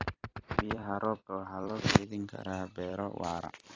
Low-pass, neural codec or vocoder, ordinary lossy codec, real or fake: 7.2 kHz; vocoder, 44.1 kHz, 128 mel bands every 256 samples, BigVGAN v2; AAC, 32 kbps; fake